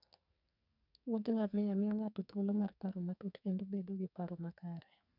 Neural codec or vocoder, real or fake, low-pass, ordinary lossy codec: codec, 44.1 kHz, 2.6 kbps, SNAC; fake; 5.4 kHz; none